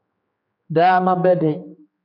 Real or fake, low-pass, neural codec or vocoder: fake; 5.4 kHz; codec, 16 kHz, 4 kbps, X-Codec, HuBERT features, trained on general audio